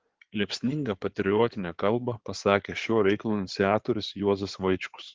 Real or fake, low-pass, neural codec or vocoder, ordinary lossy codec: fake; 7.2 kHz; codec, 16 kHz in and 24 kHz out, 2.2 kbps, FireRedTTS-2 codec; Opus, 16 kbps